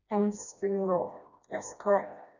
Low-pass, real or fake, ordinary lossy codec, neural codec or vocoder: 7.2 kHz; fake; none; codec, 16 kHz, 1 kbps, FreqCodec, smaller model